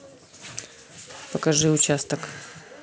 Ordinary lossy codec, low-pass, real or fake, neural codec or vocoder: none; none; real; none